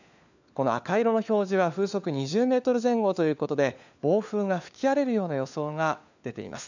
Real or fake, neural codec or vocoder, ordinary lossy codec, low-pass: fake; codec, 16 kHz, 4 kbps, FunCodec, trained on LibriTTS, 50 frames a second; none; 7.2 kHz